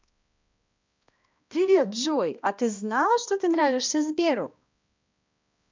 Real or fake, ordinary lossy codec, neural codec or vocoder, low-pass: fake; MP3, 64 kbps; codec, 16 kHz, 1 kbps, X-Codec, HuBERT features, trained on balanced general audio; 7.2 kHz